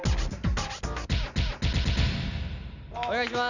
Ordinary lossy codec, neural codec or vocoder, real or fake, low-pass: none; none; real; 7.2 kHz